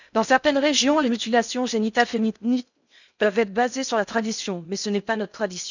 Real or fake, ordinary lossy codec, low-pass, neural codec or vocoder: fake; none; 7.2 kHz; codec, 16 kHz in and 24 kHz out, 0.8 kbps, FocalCodec, streaming, 65536 codes